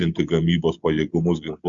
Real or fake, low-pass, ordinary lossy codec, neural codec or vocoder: real; 7.2 kHz; Opus, 64 kbps; none